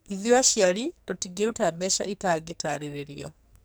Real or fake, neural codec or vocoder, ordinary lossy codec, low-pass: fake; codec, 44.1 kHz, 2.6 kbps, SNAC; none; none